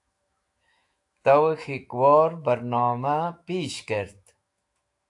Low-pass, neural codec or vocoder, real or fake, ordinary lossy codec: 10.8 kHz; autoencoder, 48 kHz, 128 numbers a frame, DAC-VAE, trained on Japanese speech; fake; AAC, 64 kbps